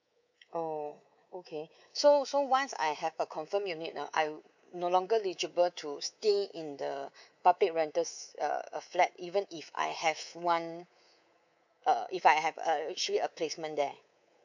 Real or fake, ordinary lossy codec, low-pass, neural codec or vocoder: fake; none; 7.2 kHz; codec, 24 kHz, 3.1 kbps, DualCodec